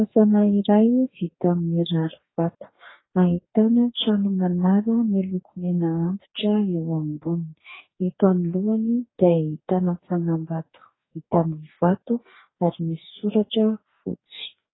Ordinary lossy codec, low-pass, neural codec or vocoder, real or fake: AAC, 16 kbps; 7.2 kHz; codec, 44.1 kHz, 2.6 kbps, DAC; fake